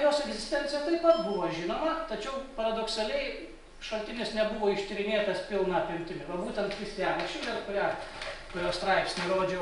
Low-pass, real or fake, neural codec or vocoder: 10.8 kHz; real; none